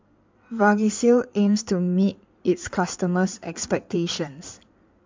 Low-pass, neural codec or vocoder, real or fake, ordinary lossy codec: 7.2 kHz; codec, 16 kHz in and 24 kHz out, 2.2 kbps, FireRedTTS-2 codec; fake; MP3, 64 kbps